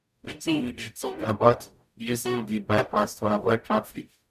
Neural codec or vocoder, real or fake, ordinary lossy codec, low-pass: codec, 44.1 kHz, 0.9 kbps, DAC; fake; none; 14.4 kHz